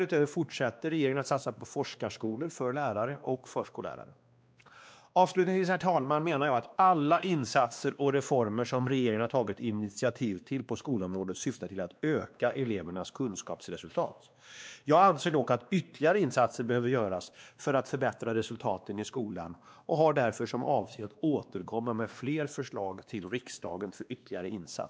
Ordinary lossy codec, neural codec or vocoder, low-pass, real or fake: none; codec, 16 kHz, 2 kbps, X-Codec, WavLM features, trained on Multilingual LibriSpeech; none; fake